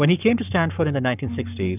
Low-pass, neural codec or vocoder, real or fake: 3.6 kHz; codec, 44.1 kHz, 7.8 kbps, Pupu-Codec; fake